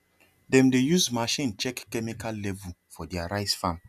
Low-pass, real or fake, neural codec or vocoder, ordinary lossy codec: 14.4 kHz; real; none; none